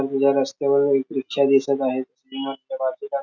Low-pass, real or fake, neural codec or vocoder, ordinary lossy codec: 7.2 kHz; real; none; none